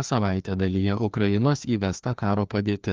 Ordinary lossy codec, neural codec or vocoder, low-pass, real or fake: Opus, 32 kbps; codec, 16 kHz, 2 kbps, FreqCodec, larger model; 7.2 kHz; fake